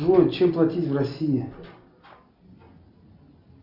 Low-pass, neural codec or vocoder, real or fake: 5.4 kHz; none; real